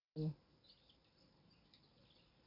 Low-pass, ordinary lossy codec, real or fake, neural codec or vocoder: 5.4 kHz; none; real; none